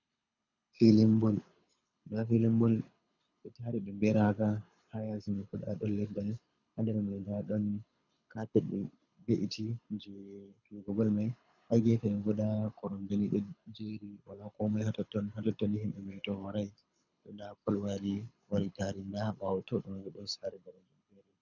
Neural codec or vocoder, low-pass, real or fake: codec, 24 kHz, 6 kbps, HILCodec; 7.2 kHz; fake